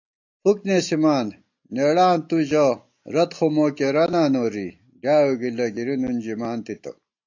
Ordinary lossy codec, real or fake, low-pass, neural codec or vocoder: AAC, 48 kbps; real; 7.2 kHz; none